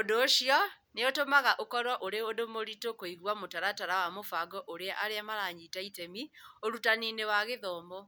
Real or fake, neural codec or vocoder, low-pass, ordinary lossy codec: real; none; none; none